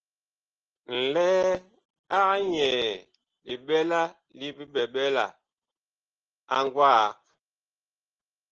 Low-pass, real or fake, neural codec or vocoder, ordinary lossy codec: 7.2 kHz; real; none; Opus, 16 kbps